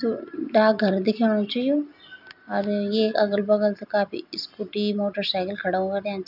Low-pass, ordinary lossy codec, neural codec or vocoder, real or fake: 5.4 kHz; none; none; real